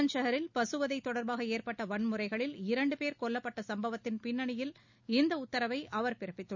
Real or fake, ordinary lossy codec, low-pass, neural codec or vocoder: real; none; 7.2 kHz; none